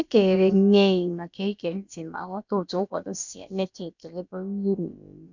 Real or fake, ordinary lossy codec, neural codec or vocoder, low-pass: fake; none; codec, 16 kHz, about 1 kbps, DyCAST, with the encoder's durations; 7.2 kHz